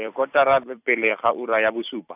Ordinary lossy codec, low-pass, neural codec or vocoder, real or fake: none; 3.6 kHz; vocoder, 44.1 kHz, 128 mel bands every 256 samples, BigVGAN v2; fake